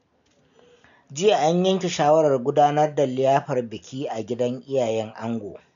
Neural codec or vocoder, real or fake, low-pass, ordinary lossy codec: none; real; 7.2 kHz; none